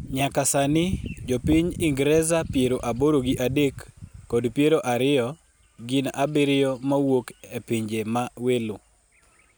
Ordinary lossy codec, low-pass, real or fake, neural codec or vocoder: none; none; real; none